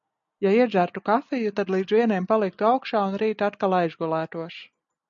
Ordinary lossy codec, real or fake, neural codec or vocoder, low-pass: MP3, 64 kbps; real; none; 7.2 kHz